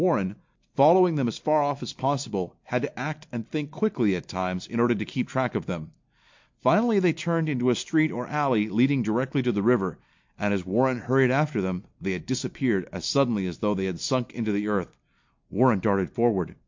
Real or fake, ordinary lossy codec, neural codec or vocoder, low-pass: real; MP3, 48 kbps; none; 7.2 kHz